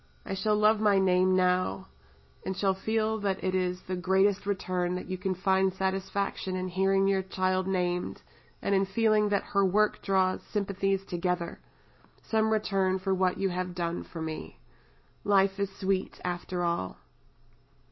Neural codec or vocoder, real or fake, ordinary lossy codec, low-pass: none; real; MP3, 24 kbps; 7.2 kHz